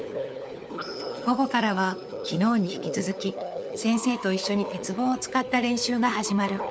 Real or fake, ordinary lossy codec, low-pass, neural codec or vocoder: fake; none; none; codec, 16 kHz, 4 kbps, FunCodec, trained on LibriTTS, 50 frames a second